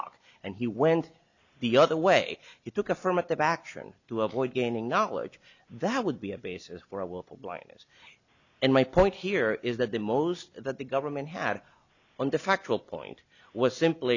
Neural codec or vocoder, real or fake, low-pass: none; real; 7.2 kHz